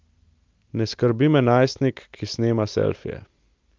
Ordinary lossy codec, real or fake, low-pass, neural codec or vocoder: Opus, 24 kbps; real; 7.2 kHz; none